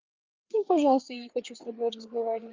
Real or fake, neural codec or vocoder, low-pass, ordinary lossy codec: fake; codec, 16 kHz, 4 kbps, FreqCodec, larger model; 7.2 kHz; Opus, 24 kbps